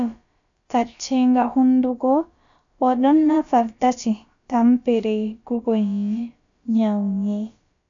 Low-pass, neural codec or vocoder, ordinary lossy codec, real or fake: 7.2 kHz; codec, 16 kHz, about 1 kbps, DyCAST, with the encoder's durations; AAC, 64 kbps; fake